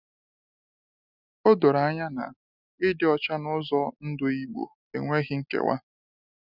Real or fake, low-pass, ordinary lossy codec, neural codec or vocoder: real; 5.4 kHz; none; none